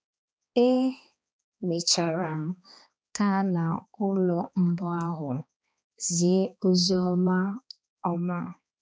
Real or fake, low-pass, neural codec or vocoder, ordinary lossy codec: fake; none; codec, 16 kHz, 2 kbps, X-Codec, HuBERT features, trained on balanced general audio; none